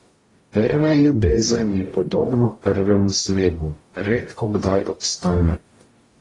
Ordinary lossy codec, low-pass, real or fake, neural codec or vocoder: AAC, 32 kbps; 10.8 kHz; fake; codec, 44.1 kHz, 0.9 kbps, DAC